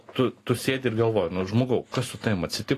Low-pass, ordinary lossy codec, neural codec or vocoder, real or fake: 14.4 kHz; AAC, 48 kbps; none; real